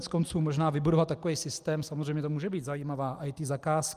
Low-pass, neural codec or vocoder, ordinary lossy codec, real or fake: 14.4 kHz; none; Opus, 32 kbps; real